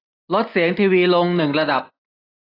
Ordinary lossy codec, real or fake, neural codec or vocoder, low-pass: AAC, 24 kbps; real; none; 5.4 kHz